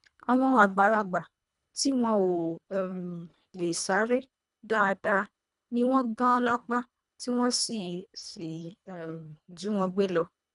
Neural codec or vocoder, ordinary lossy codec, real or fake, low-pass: codec, 24 kHz, 1.5 kbps, HILCodec; none; fake; 10.8 kHz